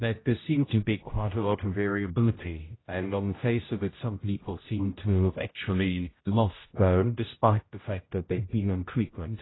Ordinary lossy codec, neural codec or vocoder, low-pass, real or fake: AAC, 16 kbps; codec, 16 kHz, 0.5 kbps, X-Codec, HuBERT features, trained on general audio; 7.2 kHz; fake